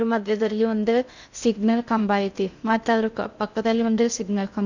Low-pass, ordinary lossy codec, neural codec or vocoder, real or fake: 7.2 kHz; none; codec, 16 kHz in and 24 kHz out, 0.6 kbps, FocalCodec, streaming, 2048 codes; fake